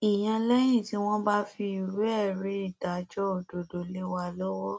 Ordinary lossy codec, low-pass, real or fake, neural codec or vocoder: none; none; real; none